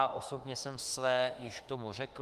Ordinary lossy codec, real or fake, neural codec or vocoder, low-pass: Opus, 24 kbps; fake; autoencoder, 48 kHz, 32 numbers a frame, DAC-VAE, trained on Japanese speech; 14.4 kHz